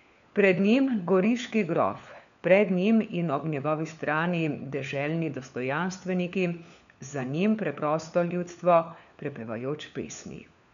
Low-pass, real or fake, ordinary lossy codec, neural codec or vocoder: 7.2 kHz; fake; none; codec, 16 kHz, 4 kbps, FunCodec, trained on LibriTTS, 50 frames a second